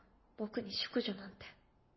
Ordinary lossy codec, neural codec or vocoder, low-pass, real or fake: MP3, 24 kbps; none; 7.2 kHz; real